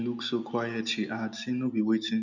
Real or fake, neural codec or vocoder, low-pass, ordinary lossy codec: real; none; 7.2 kHz; none